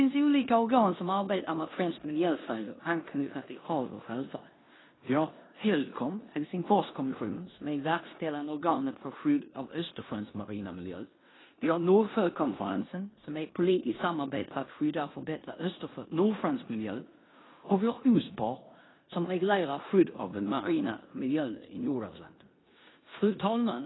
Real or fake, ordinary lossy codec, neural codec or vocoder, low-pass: fake; AAC, 16 kbps; codec, 16 kHz in and 24 kHz out, 0.9 kbps, LongCat-Audio-Codec, four codebook decoder; 7.2 kHz